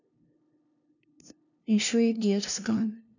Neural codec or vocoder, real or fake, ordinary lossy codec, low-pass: codec, 16 kHz, 0.5 kbps, FunCodec, trained on LibriTTS, 25 frames a second; fake; none; 7.2 kHz